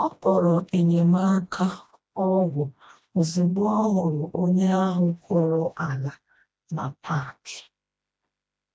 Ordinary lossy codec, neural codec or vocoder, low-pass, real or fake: none; codec, 16 kHz, 1 kbps, FreqCodec, smaller model; none; fake